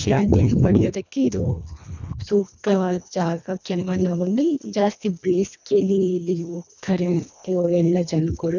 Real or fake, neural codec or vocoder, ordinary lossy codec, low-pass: fake; codec, 24 kHz, 1.5 kbps, HILCodec; none; 7.2 kHz